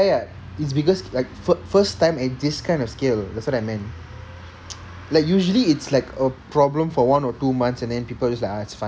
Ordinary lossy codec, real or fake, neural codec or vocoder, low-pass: none; real; none; none